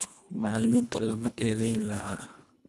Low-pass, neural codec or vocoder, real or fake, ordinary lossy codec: none; codec, 24 kHz, 1.5 kbps, HILCodec; fake; none